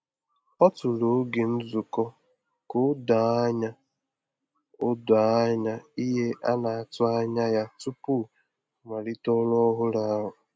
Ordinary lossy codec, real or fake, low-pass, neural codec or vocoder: none; real; none; none